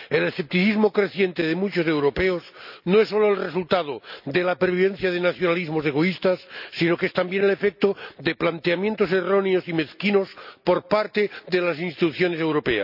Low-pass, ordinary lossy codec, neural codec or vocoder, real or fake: 5.4 kHz; none; none; real